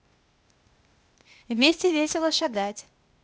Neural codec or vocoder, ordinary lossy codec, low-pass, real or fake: codec, 16 kHz, 0.8 kbps, ZipCodec; none; none; fake